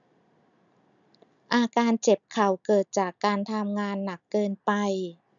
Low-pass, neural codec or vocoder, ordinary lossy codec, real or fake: 7.2 kHz; none; none; real